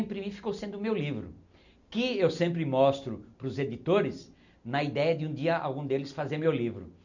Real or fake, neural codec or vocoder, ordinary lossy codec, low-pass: real; none; none; 7.2 kHz